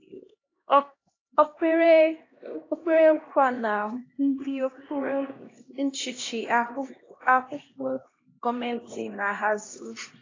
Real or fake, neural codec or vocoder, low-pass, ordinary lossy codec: fake; codec, 16 kHz, 1 kbps, X-Codec, HuBERT features, trained on LibriSpeech; 7.2 kHz; AAC, 32 kbps